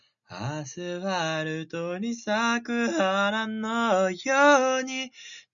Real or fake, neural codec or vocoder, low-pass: real; none; 7.2 kHz